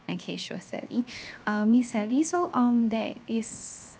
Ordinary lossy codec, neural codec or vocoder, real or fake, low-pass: none; codec, 16 kHz, 0.7 kbps, FocalCodec; fake; none